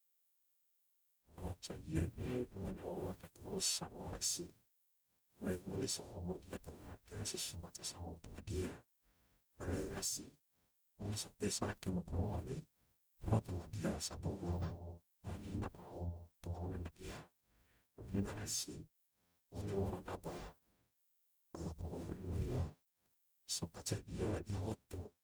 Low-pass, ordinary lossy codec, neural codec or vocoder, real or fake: none; none; codec, 44.1 kHz, 0.9 kbps, DAC; fake